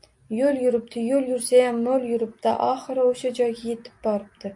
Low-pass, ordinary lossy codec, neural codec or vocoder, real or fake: 10.8 kHz; MP3, 64 kbps; none; real